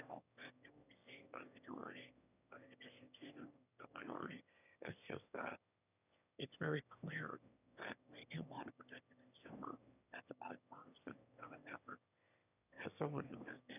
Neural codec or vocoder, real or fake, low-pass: autoencoder, 22.05 kHz, a latent of 192 numbers a frame, VITS, trained on one speaker; fake; 3.6 kHz